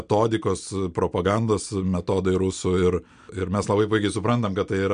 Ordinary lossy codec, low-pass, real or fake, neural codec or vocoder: MP3, 64 kbps; 9.9 kHz; real; none